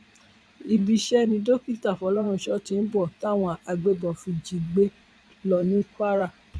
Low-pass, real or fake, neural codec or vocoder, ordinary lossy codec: none; fake; vocoder, 22.05 kHz, 80 mel bands, Vocos; none